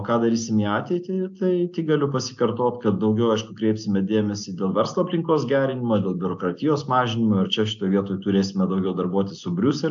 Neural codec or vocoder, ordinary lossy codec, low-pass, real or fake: none; AAC, 64 kbps; 7.2 kHz; real